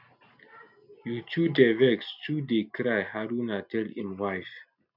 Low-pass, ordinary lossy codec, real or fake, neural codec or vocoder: 5.4 kHz; none; real; none